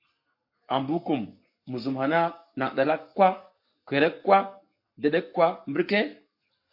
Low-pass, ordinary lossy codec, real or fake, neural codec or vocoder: 5.4 kHz; MP3, 32 kbps; fake; codec, 44.1 kHz, 7.8 kbps, Pupu-Codec